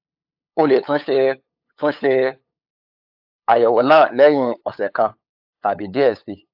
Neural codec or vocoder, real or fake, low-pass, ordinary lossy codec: codec, 16 kHz, 8 kbps, FunCodec, trained on LibriTTS, 25 frames a second; fake; 5.4 kHz; AAC, 48 kbps